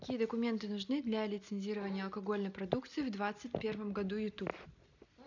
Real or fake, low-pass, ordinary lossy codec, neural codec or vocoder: real; 7.2 kHz; none; none